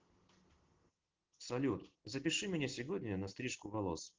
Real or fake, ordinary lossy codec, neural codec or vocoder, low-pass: real; Opus, 16 kbps; none; 7.2 kHz